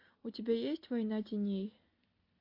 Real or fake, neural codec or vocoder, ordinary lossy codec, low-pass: real; none; AAC, 48 kbps; 5.4 kHz